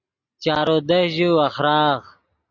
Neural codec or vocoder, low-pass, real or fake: none; 7.2 kHz; real